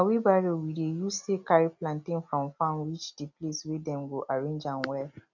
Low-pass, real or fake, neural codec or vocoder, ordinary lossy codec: 7.2 kHz; real; none; none